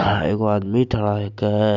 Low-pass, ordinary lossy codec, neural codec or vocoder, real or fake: 7.2 kHz; none; none; real